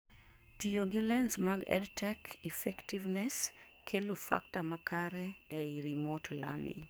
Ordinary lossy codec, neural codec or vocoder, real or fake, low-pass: none; codec, 44.1 kHz, 2.6 kbps, SNAC; fake; none